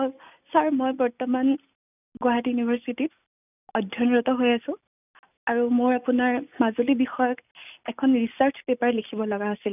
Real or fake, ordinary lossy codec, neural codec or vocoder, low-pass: real; none; none; 3.6 kHz